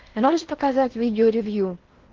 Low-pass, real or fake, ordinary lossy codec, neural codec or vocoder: 7.2 kHz; fake; Opus, 16 kbps; codec, 16 kHz in and 24 kHz out, 0.6 kbps, FocalCodec, streaming, 4096 codes